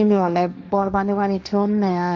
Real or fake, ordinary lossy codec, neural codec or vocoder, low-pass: fake; none; codec, 16 kHz, 1.1 kbps, Voila-Tokenizer; none